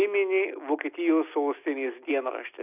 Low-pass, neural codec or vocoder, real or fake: 3.6 kHz; none; real